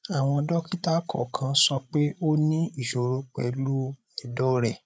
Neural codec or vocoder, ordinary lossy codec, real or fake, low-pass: codec, 16 kHz, 8 kbps, FreqCodec, larger model; none; fake; none